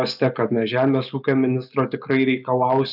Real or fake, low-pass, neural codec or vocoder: real; 5.4 kHz; none